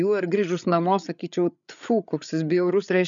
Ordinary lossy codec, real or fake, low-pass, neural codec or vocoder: MP3, 96 kbps; fake; 7.2 kHz; codec, 16 kHz, 8 kbps, FreqCodec, larger model